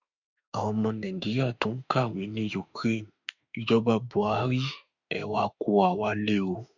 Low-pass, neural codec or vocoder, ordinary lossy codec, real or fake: 7.2 kHz; autoencoder, 48 kHz, 32 numbers a frame, DAC-VAE, trained on Japanese speech; none; fake